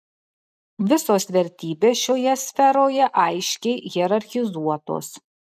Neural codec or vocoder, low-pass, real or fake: none; 14.4 kHz; real